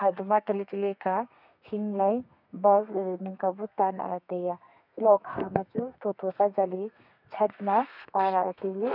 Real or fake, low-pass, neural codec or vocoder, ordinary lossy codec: fake; 5.4 kHz; codec, 32 kHz, 1.9 kbps, SNAC; none